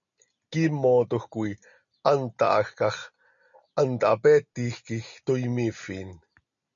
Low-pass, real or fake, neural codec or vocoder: 7.2 kHz; real; none